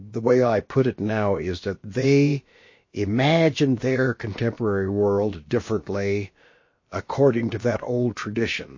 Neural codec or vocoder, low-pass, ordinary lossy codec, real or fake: codec, 16 kHz, about 1 kbps, DyCAST, with the encoder's durations; 7.2 kHz; MP3, 32 kbps; fake